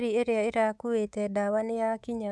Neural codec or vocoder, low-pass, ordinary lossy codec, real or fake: codec, 24 kHz, 3.1 kbps, DualCodec; none; none; fake